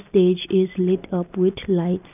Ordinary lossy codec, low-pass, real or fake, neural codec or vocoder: none; 3.6 kHz; real; none